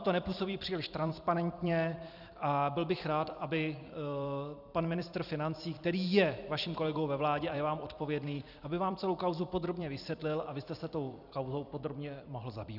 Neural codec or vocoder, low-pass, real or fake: vocoder, 44.1 kHz, 128 mel bands every 256 samples, BigVGAN v2; 5.4 kHz; fake